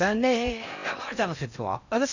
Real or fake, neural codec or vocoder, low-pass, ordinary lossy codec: fake; codec, 16 kHz in and 24 kHz out, 0.8 kbps, FocalCodec, streaming, 65536 codes; 7.2 kHz; none